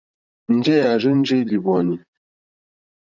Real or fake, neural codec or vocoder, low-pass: fake; vocoder, 44.1 kHz, 128 mel bands, Pupu-Vocoder; 7.2 kHz